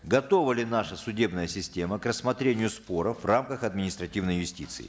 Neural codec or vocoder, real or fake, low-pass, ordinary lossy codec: none; real; none; none